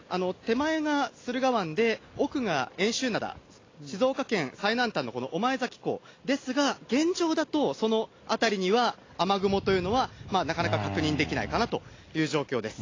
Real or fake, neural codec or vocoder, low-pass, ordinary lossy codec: real; none; 7.2 kHz; AAC, 32 kbps